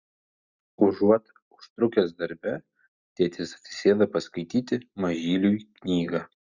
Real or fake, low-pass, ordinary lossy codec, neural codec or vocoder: real; 7.2 kHz; Opus, 64 kbps; none